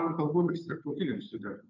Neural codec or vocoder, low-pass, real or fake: codec, 16 kHz, 2 kbps, FunCodec, trained on Chinese and English, 25 frames a second; 7.2 kHz; fake